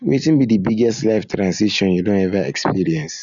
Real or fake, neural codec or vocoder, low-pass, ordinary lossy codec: real; none; 7.2 kHz; none